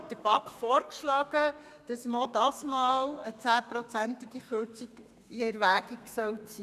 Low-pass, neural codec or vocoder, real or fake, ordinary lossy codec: 14.4 kHz; codec, 32 kHz, 1.9 kbps, SNAC; fake; none